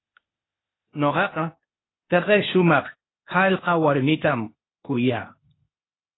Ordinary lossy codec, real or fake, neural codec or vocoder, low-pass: AAC, 16 kbps; fake; codec, 16 kHz, 0.8 kbps, ZipCodec; 7.2 kHz